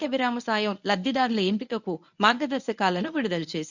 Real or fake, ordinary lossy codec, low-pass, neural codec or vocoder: fake; none; 7.2 kHz; codec, 24 kHz, 0.9 kbps, WavTokenizer, medium speech release version 1